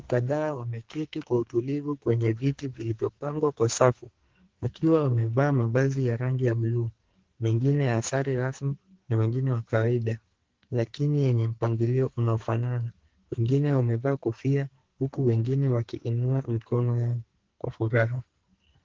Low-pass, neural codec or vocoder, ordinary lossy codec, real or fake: 7.2 kHz; codec, 44.1 kHz, 2.6 kbps, SNAC; Opus, 16 kbps; fake